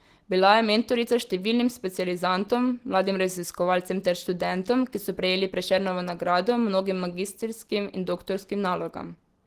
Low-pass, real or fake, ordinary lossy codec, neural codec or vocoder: 14.4 kHz; real; Opus, 16 kbps; none